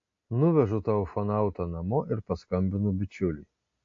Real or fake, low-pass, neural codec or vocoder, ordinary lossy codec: real; 7.2 kHz; none; AAC, 48 kbps